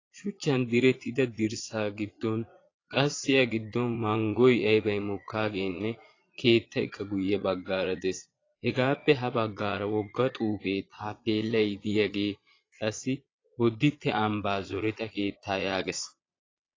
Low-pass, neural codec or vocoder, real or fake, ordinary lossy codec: 7.2 kHz; vocoder, 22.05 kHz, 80 mel bands, Vocos; fake; AAC, 32 kbps